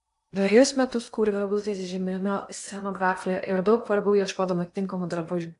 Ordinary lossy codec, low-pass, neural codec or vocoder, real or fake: AAC, 64 kbps; 10.8 kHz; codec, 16 kHz in and 24 kHz out, 0.8 kbps, FocalCodec, streaming, 65536 codes; fake